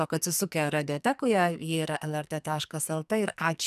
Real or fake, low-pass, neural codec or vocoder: fake; 14.4 kHz; codec, 44.1 kHz, 2.6 kbps, SNAC